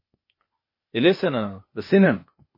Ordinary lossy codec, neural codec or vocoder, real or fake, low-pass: MP3, 24 kbps; codec, 16 kHz, 0.8 kbps, ZipCodec; fake; 5.4 kHz